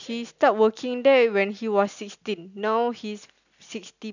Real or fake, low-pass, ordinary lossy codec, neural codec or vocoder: real; 7.2 kHz; none; none